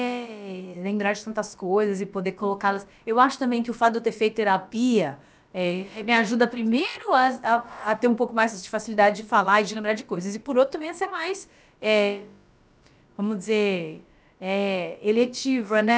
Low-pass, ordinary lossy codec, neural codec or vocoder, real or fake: none; none; codec, 16 kHz, about 1 kbps, DyCAST, with the encoder's durations; fake